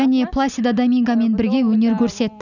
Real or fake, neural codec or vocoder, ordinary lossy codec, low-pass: real; none; none; 7.2 kHz